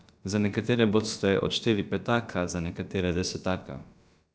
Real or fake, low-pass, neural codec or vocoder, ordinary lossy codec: fake; none; codec, 16 kHz, about 1 kbps, DyCAST, with the encoder's durations; none